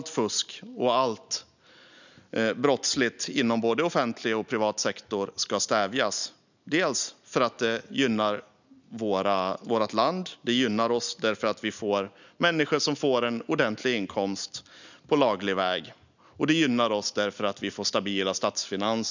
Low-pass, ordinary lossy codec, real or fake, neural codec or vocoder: 7.2 kHz; none; real; none